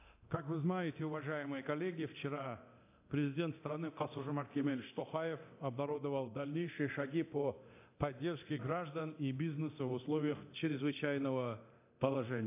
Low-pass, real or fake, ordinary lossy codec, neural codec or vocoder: 3.6 kHz; fake; AAC, 24 kbps; codec, 24 kHz, 0.9 kbps, DualCodec